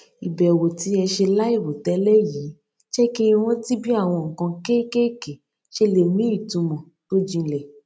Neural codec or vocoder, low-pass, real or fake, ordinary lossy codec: none; none; real; none